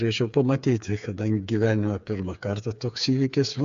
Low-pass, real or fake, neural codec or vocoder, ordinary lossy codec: 7.2 kHz; fake; codec, 16 kHz, 4 kbps, FreqCodec, smaller model; AAC, 64 kbps